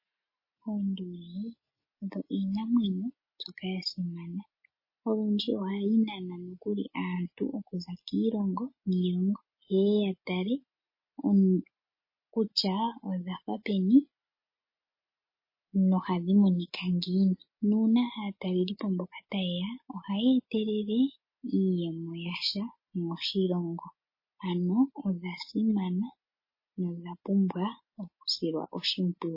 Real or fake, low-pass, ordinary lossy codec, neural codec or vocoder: real; 5.4 kHz; MP3, 24 kbps; none